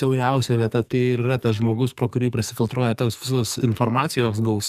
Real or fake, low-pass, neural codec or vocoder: fake; 14.4 kHz; codec, 44.1 kHz, 2.6 kbps, SNAC